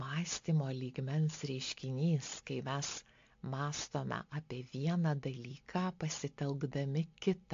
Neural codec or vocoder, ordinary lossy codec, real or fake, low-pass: none; AAC, 48 kbps; real; 7.2 kHz